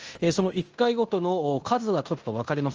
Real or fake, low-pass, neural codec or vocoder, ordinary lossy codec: fake; 7.2 kHz; codec, 16 kHz in and 24 kHz out, 0.9 kbps, LongCat-Audio-Codec, fine tuned four codebook decoder; Opus, 24 kbps